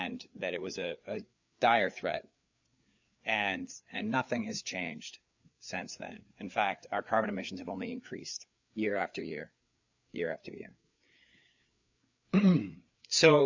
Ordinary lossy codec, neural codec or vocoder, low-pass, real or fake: MP3, 64 kbps; codec, 16 kHz, 4 kbps, FreqCodec, larger model; 7.2 kHz; fake